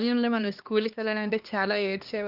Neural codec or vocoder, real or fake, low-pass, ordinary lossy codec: codec, 16 kHz, 2 kbps, X-Codec, HuBERT features, trained on LibriSpeech; fake; 5.4 kHz; Opus, 24 kbps